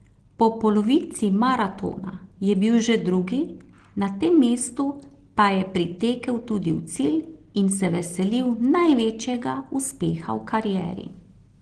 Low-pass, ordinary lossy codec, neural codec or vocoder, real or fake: 9.9 kHz; Opus, 16 kbps; none; real